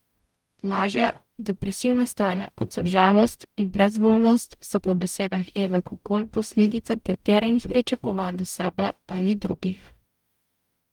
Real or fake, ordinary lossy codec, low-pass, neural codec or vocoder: fake; Opus, 32 kbps; 19.8 kHz; codec, 44.1 kHz, 0.9 kbps, DAC